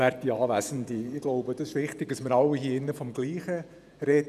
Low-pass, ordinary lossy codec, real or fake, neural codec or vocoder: 14.4 kHz; none; fake; vocoder, 48 kHz, 128 mel bands, Vocos